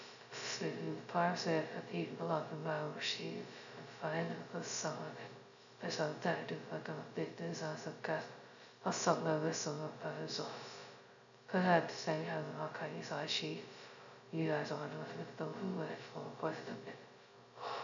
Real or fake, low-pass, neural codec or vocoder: fake; 7.2 kHz; codec, 16 kHz, 0.2 kbps, FocalCodec